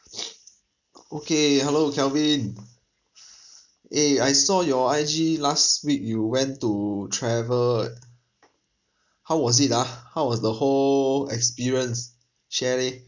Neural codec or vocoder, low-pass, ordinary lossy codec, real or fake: none; 7.2 kHz; none; real